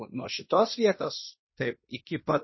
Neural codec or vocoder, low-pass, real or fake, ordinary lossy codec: codec, 16 kHz in and 24 kHz out, 0.9 kbps, LongCat-Audio-Codec, fine tuned four codebook decoder; 7.2 kHz; fake; MP3, 24 kbps